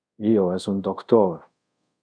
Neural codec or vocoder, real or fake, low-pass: codec, 24 kHz, 0.5 kbps, DualCodec; fake; 9.9 kHz